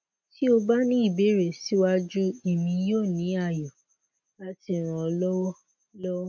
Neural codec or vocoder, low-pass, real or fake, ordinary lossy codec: none; 7.2 kHz; real; none